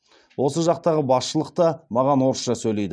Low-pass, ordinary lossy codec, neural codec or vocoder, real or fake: none; none; none; real